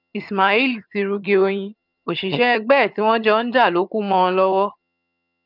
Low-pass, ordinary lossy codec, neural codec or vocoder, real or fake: 5.4 kHz; none; vocoder, 22.05 kHz, 80 mel bands, HiFi-GAN; fake